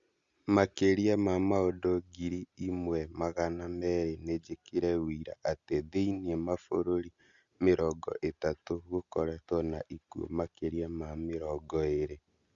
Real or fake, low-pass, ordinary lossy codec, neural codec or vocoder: real; 7.2 kHz; Opus, 64 kbps; none